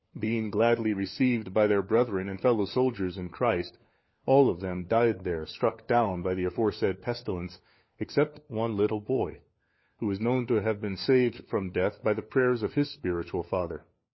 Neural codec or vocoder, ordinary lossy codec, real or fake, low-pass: codec, 16 kHz, 4 kbps, FunCodec, trained on LibriTTS, 50 frames a second; MP3, 24 kbps; fake; 7.2 kHz